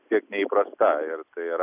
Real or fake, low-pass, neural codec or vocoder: real; 3.6 kHz; none